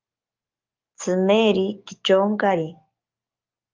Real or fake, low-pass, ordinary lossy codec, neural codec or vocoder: fake; 7.2 kHz; Opus, 32 kbps; codec, 44.1 kHz, 7.8 kbps, DAC